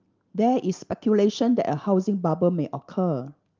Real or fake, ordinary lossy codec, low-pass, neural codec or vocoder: real; Opus, 24 kbps; 7.2 kHz; none